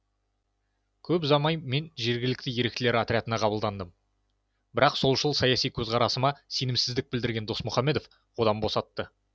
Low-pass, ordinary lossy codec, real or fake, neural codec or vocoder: none; none; real; none